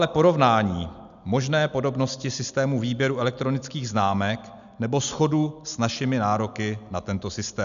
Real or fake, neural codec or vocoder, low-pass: real; none; 7.2 kHz